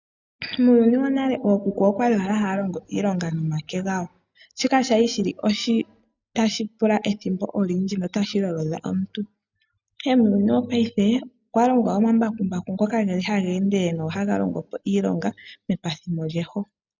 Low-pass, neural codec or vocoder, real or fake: 7.2 kHz; none; real